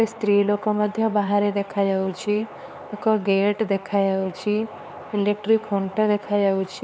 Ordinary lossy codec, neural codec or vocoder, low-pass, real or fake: none; codec, 16 kHz, 4 kbps, X-Codec, HuBERT features, trained on LibriSpeech; none; fake